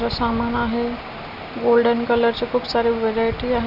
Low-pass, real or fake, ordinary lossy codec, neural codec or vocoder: 5.4 kHz; real; none; none